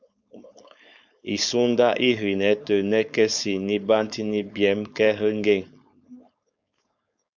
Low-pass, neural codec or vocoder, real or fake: 7.2 kHz; codec, 16 kHz, 4.8 kbps, FACodec; fake